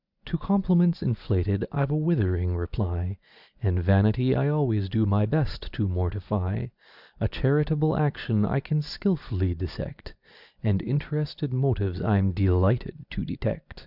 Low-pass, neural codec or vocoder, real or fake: 5.4 kHz; none; real